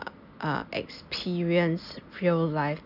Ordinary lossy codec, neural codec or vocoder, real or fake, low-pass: none; none; real; 5.4 kHz